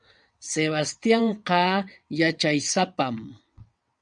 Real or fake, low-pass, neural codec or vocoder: fake; 9.9 kHz; vocoder, 22.05 kHz, 80 mel bands, WaveNeXt